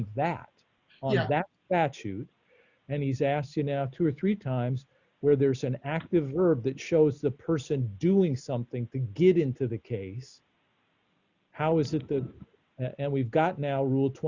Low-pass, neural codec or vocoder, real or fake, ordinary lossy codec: 7.2 kHz; none; real; Opus, 64 kbps